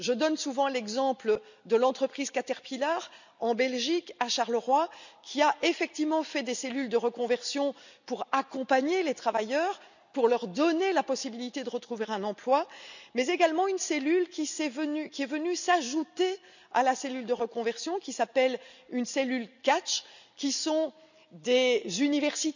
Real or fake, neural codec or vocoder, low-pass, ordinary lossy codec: real; none; 7.2 kHz; none